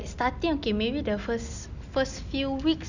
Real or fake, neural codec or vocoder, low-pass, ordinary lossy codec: real; none; 7.2 kHz; none